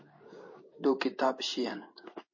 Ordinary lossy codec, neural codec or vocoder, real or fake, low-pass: MP3, 32 kbps; codec, 16 kHz in and 24 kHz out, 1 kbps, XY-Tokenizer; fake; 7.2 kHz